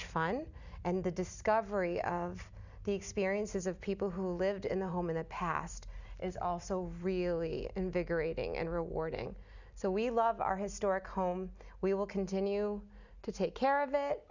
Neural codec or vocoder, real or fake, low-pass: none; real; 7.2 kHz